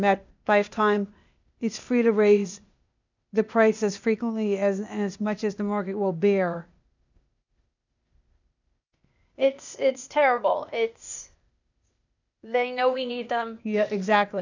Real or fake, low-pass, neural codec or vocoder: fake; 7.2 kHz; codec, 16 kHz, 0.8 kbps, ZipCodec